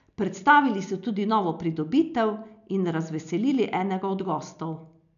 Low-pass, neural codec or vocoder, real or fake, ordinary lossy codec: 7.2 kHz; none; real; none